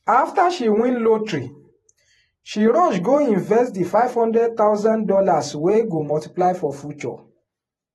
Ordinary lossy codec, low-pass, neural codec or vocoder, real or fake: AAC, 32 kbps; 19.8 kHz; vocoder, 48 kHz, 128 mel bands, Vocos; fake